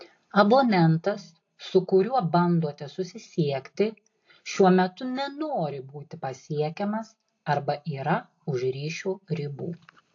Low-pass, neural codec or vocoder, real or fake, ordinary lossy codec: 7.2 kHz; none; real; AAC, 48 kbps